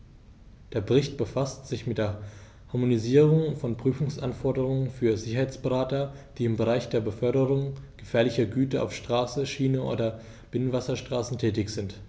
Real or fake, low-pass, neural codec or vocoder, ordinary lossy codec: real; none; none; none